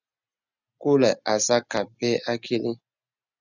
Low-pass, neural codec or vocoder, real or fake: 7.2 kHz; none; real